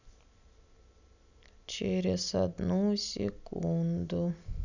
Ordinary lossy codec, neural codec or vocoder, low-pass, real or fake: none; none; 7.2 kHz; real